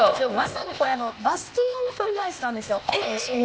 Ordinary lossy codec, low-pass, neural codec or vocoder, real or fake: none; none; codec, 16 kHz, 0.8 kbps, ZipCodec; fake